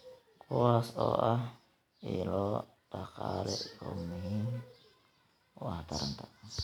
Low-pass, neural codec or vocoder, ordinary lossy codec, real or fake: 19.8 kHz; none; none; real